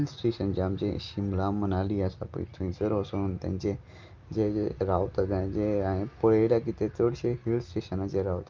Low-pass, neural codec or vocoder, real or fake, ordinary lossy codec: 7.2 kHz; none; real; Opus, 32 kbps